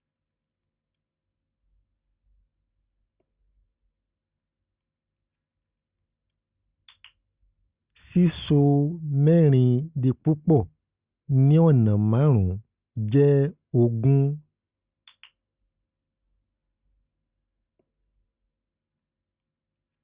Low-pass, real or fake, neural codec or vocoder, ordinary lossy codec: 3.6 kHz; real; none; Opus, 64 kbps